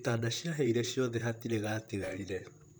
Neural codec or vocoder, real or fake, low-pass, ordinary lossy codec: vocoder, 44.1 kHz, 128 mel bands, Pupu-Vocoder; fake; none; none